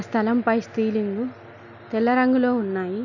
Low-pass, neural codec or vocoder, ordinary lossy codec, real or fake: 7.2 kHz; none; none; real